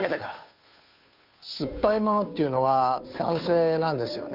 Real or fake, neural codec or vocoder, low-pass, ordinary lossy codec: fake; codec, 16 kHz, 2 kbps, FunCodec, trained on Chinese and English, 25 frames a second; 5.4 kHz; AAC, 48 kbps